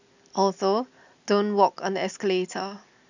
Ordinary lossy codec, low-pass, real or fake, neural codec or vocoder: none; 7.2 kHz; real; none